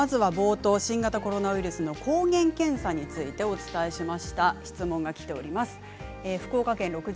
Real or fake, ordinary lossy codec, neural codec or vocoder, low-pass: real; none; none; none